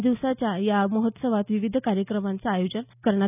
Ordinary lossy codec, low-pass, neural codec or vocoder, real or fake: none; 3.6 kHz; none; real